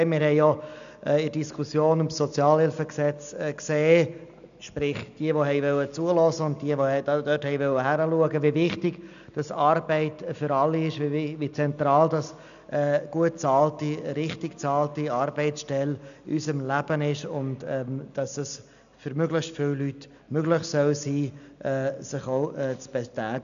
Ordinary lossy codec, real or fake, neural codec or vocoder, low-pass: none; real; none; 7.2 kHz